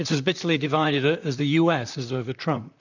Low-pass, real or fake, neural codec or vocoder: 7.2 kHz; fake; vocoder, 44.1 kHz, 128 mel bands, Pupu-Vocoder